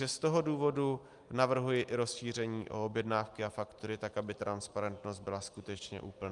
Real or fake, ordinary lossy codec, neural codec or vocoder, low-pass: real; Opus, 32 kbps; none; 10.8 kHz